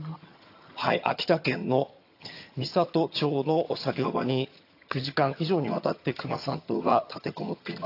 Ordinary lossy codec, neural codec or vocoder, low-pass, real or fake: AAC, 32 kbps; vocoder, 22.05 kHz, 80 mel bands, HiFi-GAN; 5.4 kHz; fake